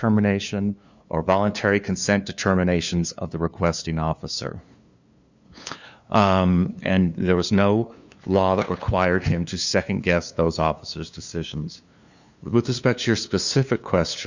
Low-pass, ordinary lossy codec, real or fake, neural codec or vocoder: 7.2 kHz; Opus, 64 kbps; fake; codec, 16 kHz, 2 kbps, FunCodec, trained on LibriTTS, 25 frames a second